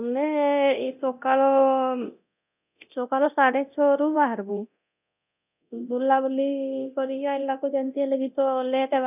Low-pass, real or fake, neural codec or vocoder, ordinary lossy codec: 3.6 kHz; fake; codec, 24 kHz, 0.9 kbps, DualCodec; none